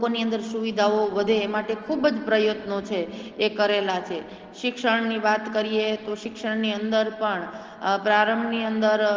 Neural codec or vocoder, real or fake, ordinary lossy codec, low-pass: none; real; Opus, 16 kbps; 7.2 kHz